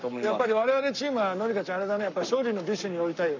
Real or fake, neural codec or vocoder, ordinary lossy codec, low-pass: fake; codec, 44.1 kHz, 7.8 kbps, Pupu-Codec; none; 7.2 kHz